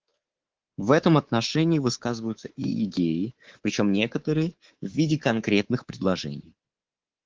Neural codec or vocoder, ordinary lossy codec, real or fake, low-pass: codec, 24 kHz, 3.1 kbps, DualCodec; Opus, 16 kbps; fake; 7.2 kHz